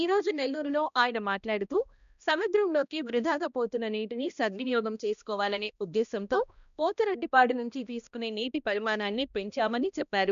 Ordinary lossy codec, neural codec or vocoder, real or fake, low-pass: none; codec, 16 kHz, 1 kbps, X-Codec, HuBERT features, trained on balanced general audio; fake; 7.2 kHz